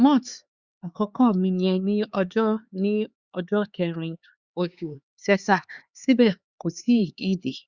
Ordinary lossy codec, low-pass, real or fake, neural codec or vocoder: Opus, 64 kbps; 7.2 kHz; fake; codec, 16 kHz, 4 kbps, X-Codec, HuBERT features, trained on LibriSpeech